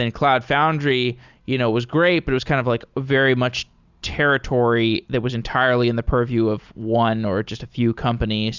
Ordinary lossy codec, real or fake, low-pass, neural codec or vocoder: Opus, 64 kbps; real; 7.2 kHz; none